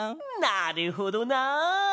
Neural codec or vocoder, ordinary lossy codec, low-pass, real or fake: none; none; none; real